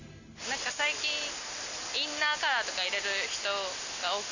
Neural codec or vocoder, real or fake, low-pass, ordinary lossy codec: none; real; 7.2 kHz; none